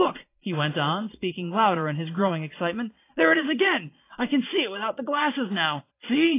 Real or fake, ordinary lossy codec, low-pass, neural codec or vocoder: fake; AAC, 24 kbps; 3.6 kHz; vocoder, 22.05 kHz, 80 mel bands, Vocos